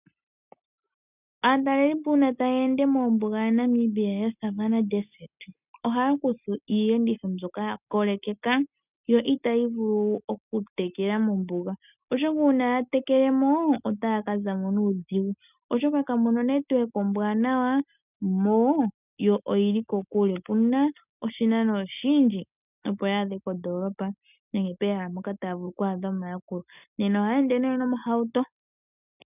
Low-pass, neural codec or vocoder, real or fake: 3.6 kHz; none; real